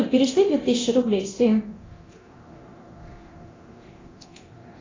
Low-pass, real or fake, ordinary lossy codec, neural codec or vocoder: 7.2 kHz; fake; AAC, 32 kbps; codec, 24 kHz, 0.9 kbps, DualCodec